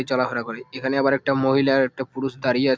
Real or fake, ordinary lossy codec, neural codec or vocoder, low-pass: real; none; none; none